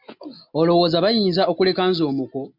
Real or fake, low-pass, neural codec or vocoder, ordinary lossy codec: real; 5.4 kHz; none; MP3, 48 kbps